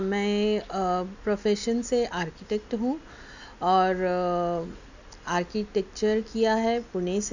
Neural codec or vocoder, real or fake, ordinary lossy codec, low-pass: none; real; none; 7.2 kHz